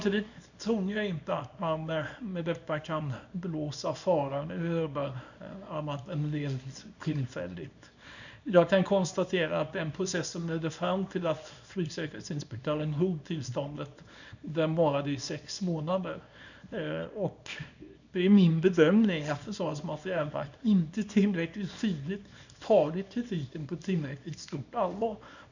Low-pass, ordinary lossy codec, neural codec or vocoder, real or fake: 7.2 kHz; none; codec, 24 kHz, 0.9 kbps, WavTokenizer, small release; fake